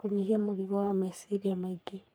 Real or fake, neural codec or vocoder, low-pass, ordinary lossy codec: fake; codec, 44.1 kHz, 3.4 kbps, Pupu-Codec; none; none